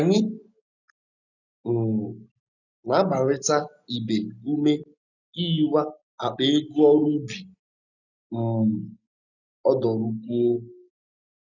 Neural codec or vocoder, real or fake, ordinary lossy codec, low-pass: none; real; none; 7.2 kHz